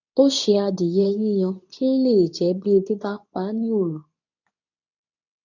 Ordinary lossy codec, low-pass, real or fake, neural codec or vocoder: none; 7.2 kHz; fake; codec, 24 kHz, 0.9 kbps, WavTokenizer, medium speech release version 2